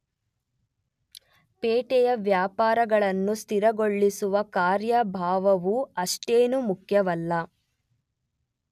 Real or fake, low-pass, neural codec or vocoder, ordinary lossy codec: real; 14.4 kHz; none; none